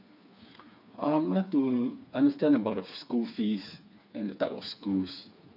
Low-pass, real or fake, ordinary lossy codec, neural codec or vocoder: 5.4 kHz; fake; none; codec, 16 kHz, 4 kbps, FreqCodec, smaller model